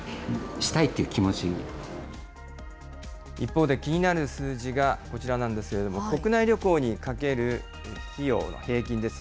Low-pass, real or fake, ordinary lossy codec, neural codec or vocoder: none; real; none; none